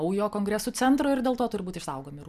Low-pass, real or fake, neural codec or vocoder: 14.4 kHz; real; none